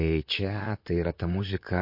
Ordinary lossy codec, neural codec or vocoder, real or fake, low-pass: MP3, 32 kbps; vocoder, 44.1 kHz, 128 mel bands, Pupu-Vocoder; fake; 5.4 kHz